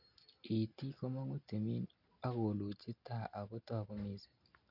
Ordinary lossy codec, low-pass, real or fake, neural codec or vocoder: none; 5.4 kHz; real; none